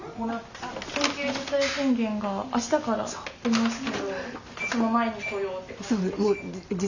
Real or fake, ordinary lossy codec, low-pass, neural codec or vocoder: real; none; 7.2 kHz; none